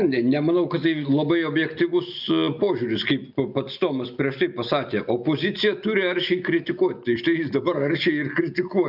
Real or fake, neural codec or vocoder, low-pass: real; none; 5.4 kHz